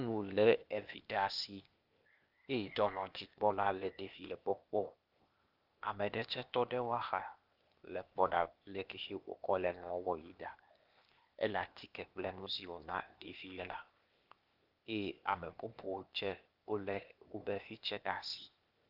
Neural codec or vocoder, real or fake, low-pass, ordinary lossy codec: codec, 16 kHz, 0.8 kbps, ZipCodec; fake; 5.4 kHz; Opus, 24 kbps